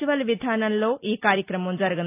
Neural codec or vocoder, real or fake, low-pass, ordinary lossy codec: none; real; 3.6 kHz; AAC, 24 kbps